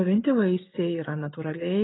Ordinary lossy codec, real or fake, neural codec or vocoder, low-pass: AAC, 16 kbps; real; none; 7.2 kHz